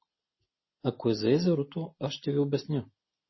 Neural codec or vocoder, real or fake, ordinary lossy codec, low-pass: none; real; MP3, 24 kbps; 7.2 kHz